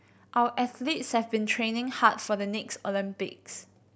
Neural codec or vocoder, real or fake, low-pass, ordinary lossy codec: none; real; none; none